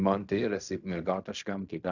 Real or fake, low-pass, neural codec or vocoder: fake; 7.2 kHz; codec, 16 kHz in and 24 kHz out, 0.4 kbps, LongCat-Audio-Codec, fine tuned four codebook decoder